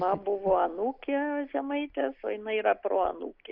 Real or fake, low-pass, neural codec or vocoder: real; 5.4 kHz; none